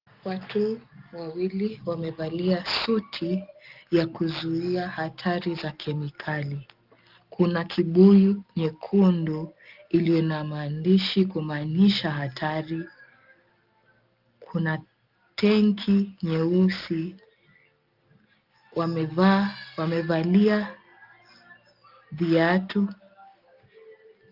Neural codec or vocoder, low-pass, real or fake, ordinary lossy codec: none; 5.4 kHz; real; Opus, 32 kbps